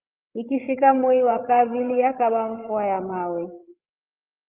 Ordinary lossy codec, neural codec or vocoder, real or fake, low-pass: Opus, 24 kbps; codec, 16 kHz, 16 kbps, FreqCodec, larger model; fake; 3.6 kHz